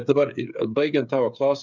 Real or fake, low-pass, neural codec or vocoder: fake; 7.2 kHz; codec, 16 kHz, 8 kbps, FreqCodec, smaller model